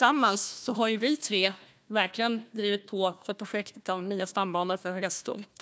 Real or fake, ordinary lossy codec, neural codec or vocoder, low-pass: fake; none; codec, 16 kHz, 1 kbps, FunCodec, trained on Chinese and English, 50 frames a second; none